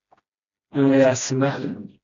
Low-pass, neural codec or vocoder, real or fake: 7.2 kHz; codec, 16 kHz, 1 kbps, FreqCodec, smaller model; fake